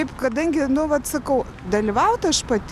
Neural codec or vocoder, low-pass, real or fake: none; 14.4 kHz; real